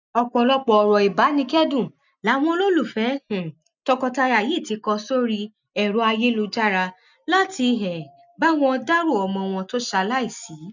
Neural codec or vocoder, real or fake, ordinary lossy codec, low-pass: none; real; none; 7.2 kHz